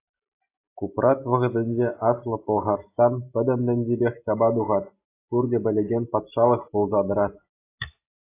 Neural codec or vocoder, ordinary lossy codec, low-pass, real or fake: none; AAC, 24 kbps; 3.6 kHz; real